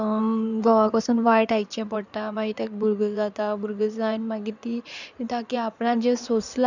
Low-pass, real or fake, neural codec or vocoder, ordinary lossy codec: 7.2 kHz; fake; codec, 16 kHz in and 24 kHz out, 2.2 kbps, FireRedTTS-2 codec; none